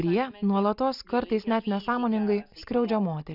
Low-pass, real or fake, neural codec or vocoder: 5.4 kHz; real; none